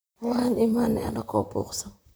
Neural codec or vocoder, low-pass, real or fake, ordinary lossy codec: vocoder, 44.1 kHz, 128 mel bands, Pupu-Vocoder; none; fake; none